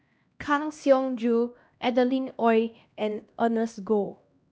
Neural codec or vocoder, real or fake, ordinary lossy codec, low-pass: codec, 16 kHz, 1 kbps, X-Codec, HuBERT features, trained on LibriSpeech; fake; none; none